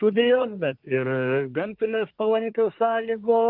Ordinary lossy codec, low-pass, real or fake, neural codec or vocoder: Opus, 32 kbps; 5.4 kHz; fake; codec, 24 kHz, 1 kbps, SNAC